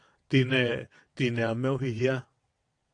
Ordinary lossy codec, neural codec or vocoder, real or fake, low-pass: AAC, 48 kbps; vocoder, 22.05 kHz, 80 mel bands, WaveNeXt; fake; 9.9 kHz